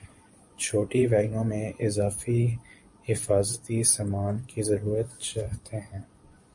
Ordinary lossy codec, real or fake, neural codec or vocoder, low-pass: MP3, 48 kbps; fake; vocoder, 24 kHz, 100 mel bands, Vocos; 10.8 kHz